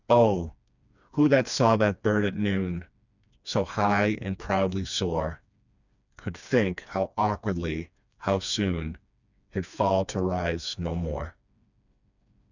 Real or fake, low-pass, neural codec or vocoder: fake; 7.2 kHz; codec, 16 kHz, 2 kbps, FreqCodec, smaller model